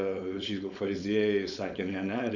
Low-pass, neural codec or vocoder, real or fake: 7.2 kHz; codec, 16 kHz, 4.8 kbps, FACodec; fake